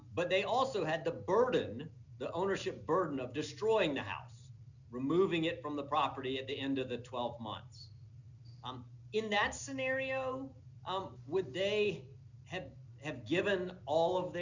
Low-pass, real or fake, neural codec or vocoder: 7.2 kHz; real; none